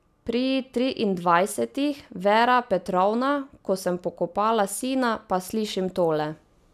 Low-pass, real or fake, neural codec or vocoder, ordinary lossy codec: 14.4 kHz; real; none; none